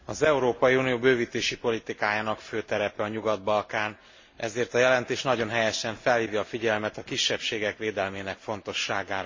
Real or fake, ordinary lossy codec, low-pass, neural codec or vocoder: real; MP3, 32 kbps; 7.2 kHz; none